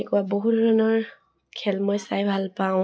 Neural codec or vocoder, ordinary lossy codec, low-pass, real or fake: none; none; none; real